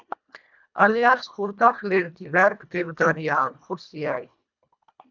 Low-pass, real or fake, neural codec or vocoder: 7.2 kHz; fake; codec, 24 kHz, 1.5 kbps, HILCodec